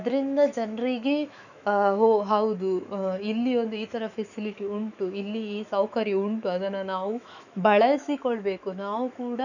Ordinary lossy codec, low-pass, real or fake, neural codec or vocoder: none; 7.2 kHz; fake; autoencoder, 48 kHz, 128 numbers a frame, DAC-VAE, trained on Japanese speech